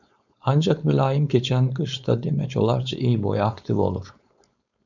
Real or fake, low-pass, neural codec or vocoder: fake; 7.2 kHz; codec, 16 kHz, 4.8 kbps, FACodec